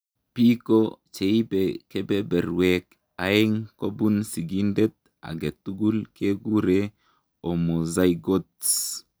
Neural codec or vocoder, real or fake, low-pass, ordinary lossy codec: none; real; none; none